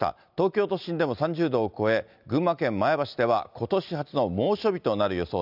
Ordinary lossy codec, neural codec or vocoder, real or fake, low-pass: none; none; real; 5.4 kHz